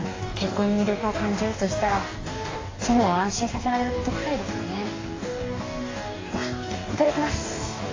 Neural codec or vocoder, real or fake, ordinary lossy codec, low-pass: codec, 44.1 kHz, 2.6 kbps, DAC; fake; AAC, 32 kbps; 7.2 kHz